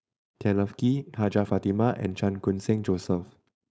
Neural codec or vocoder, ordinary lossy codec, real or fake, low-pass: codec, 16 kHz, 4.8 kbps, FACodec; none; fake; none